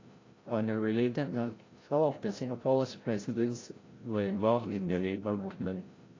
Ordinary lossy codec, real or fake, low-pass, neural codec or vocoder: AAC, 32 kbps; fake; 7.2 kHz; codec, 16 kHz, 0.5 kbps, FreqCodec, larger model